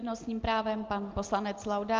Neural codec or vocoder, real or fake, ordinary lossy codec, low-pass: none; real; Opus, 24 kbps; 7.2 kHz